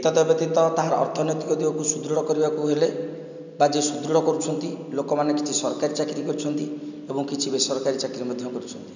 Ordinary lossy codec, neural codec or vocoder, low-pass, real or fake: none; none; 7.2 kHz; real